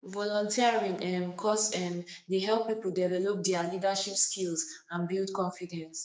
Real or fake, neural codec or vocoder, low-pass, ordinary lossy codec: fake; codec, 16 kHz, 4 kbps, X-Codec, HuBERT features, trained on general audio; none; none